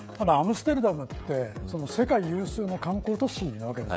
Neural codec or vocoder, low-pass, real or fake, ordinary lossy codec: codec, 16 kHz, 16 kbps, FreqCodec, smaller model; none; fake; none